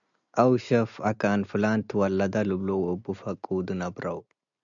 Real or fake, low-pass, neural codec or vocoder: real; 7.2 kHz; none